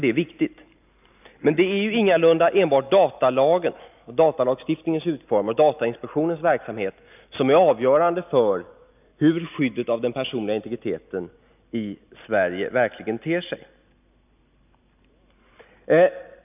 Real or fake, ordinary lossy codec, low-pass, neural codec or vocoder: real; none; 3.6 kHz; none